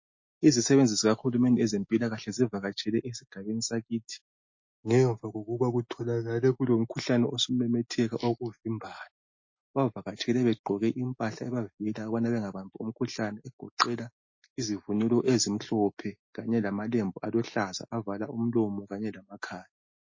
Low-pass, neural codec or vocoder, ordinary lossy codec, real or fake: 7.2 kHz; none; MP3, 32 kbps; real